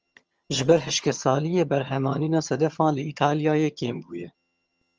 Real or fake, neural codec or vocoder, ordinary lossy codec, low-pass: fake; vocoder, 22.05 kHz, 80 mel bands, HiFi-GAN; Opus, 24 kbps; 7.2 kHz